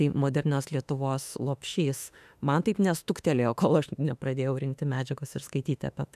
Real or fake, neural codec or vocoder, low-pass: fake; autoencoder, 48 kHz, 32 numbers a frame, DAC-VAE, trained on Japanese speech; 14.4 kHz